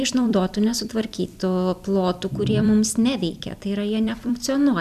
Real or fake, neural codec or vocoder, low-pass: fake; vocoder, 44.1 kHz, 128 mel bands every 256 samples, BigVGAN v2; 14.4 kHz